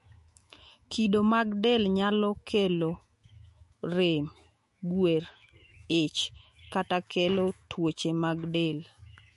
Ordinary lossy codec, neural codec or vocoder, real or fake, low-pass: MP3, 48 kbps; autoencoder, 48 kHz, 128 numbers a frame, DAC-VAE, trained on Japanese speech; fake; 14.4 kHz